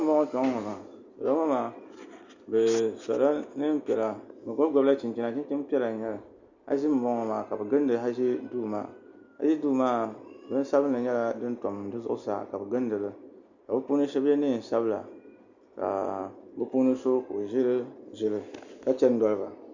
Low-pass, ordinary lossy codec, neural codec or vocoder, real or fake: 7.2 kHz; Opus, 64 kbps; none; real